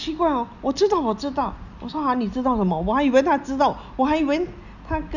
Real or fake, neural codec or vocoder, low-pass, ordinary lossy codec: real; none; 7.2 kHz; none